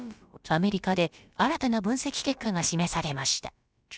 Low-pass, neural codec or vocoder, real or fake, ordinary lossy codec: none; codec, 16 kHz, about 1 kbps, DyCAST, with the encoder's durations; fake; none